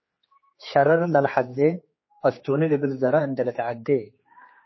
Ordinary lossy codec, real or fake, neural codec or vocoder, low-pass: MP3, 24 kbps; fake; codec, 16 kHz, 4 kbps, X-Codec, HuBERT features, trained on general audio; 7.2 kHz